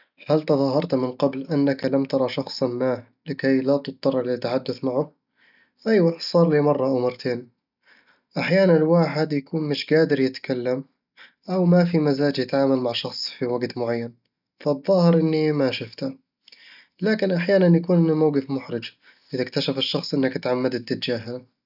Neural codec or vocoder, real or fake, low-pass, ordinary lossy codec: none; real; 5.4 kHz; none